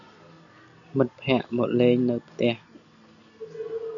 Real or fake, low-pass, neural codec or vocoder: real; 7.2 kHz; none